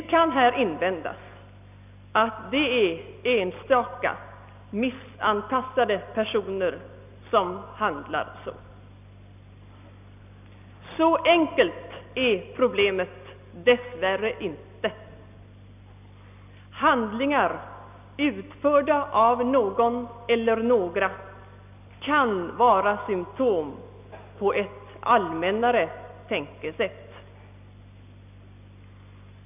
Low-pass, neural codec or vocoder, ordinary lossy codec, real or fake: 3.6 kHz; none; none; real